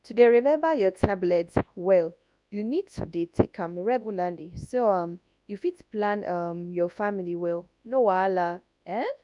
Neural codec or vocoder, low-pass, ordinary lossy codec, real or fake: codec, 24 kHz, 0.9 kbps, WavTokenizer, large speech release; 10.8 kHz; none; fake